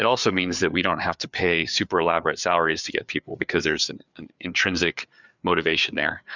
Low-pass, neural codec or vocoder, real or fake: 7.2 kHz; codec, 16 kHz, 4 kbps, FreqCodec, larger model; fake